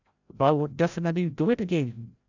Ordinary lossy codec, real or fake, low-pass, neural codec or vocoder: none; fake; 7.2 kHz; codec, 16 kHz, 0.5 kbps, FreqCodec, larger model